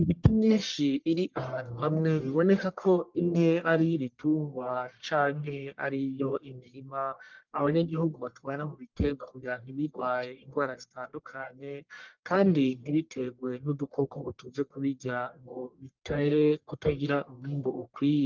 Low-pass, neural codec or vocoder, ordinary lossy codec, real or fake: 7.2 kHz; codec, 44.1 kHz, 1.7 kbps, Pupu-Codec; Opus, 32 kbps; fake